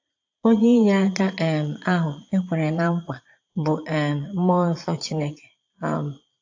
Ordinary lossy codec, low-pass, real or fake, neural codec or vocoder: AAC, 48 kbps; 7.2 kHz; fake; vocoder, 22.05 kHz, 80 mel bands, Vocos